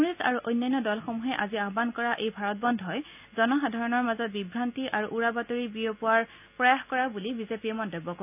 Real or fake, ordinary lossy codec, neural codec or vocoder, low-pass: real; none; none; 3.6 kHz